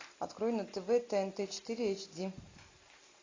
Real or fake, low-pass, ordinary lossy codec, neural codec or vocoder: real; 7.2 kHz; AAC, 32 kbps; none